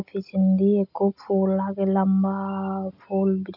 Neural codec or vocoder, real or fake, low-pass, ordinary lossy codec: none; real; 5.4 kHz; none